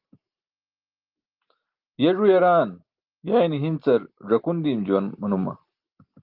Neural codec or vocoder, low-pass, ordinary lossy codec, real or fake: none; 5.4 kHz; Opus, 24 kbps; real